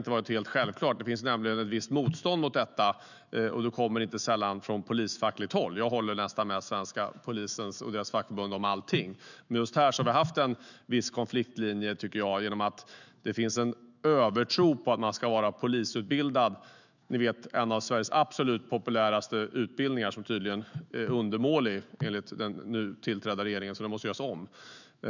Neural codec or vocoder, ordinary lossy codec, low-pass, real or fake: autoencoder, 48 kHz, 128 numbers a frame, DAC-VAE, trained on Japanese speech; none; 7.2 kHz; fake